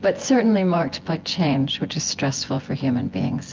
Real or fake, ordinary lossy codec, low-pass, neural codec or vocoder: fake; Opus, 32 kbps; 7.2 kHz; vocoder, 24 kHz, 100 mel bands, Vocos